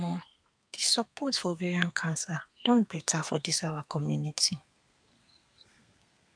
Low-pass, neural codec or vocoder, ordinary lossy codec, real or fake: 9.9 kHz; codec, 32 kHz, 1.9 kbps, SNAC; none; fake